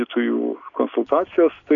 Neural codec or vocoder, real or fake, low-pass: none; real; 7.2 kHz